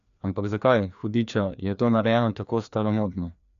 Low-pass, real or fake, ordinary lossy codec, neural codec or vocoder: 7.2 kHz; fake; none; codec, 16 kHz, 2 kbps, FreqCodec, larger model